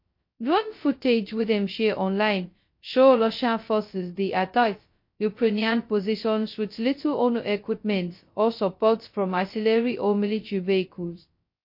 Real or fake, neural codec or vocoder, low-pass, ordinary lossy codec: fake; codec, 16 kHz, 0.2 kbps, FocalCodec; 5.4 kHz; MP3, 32 kbps